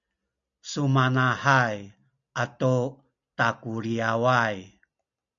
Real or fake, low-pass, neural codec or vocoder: real; 7.2 kHz; none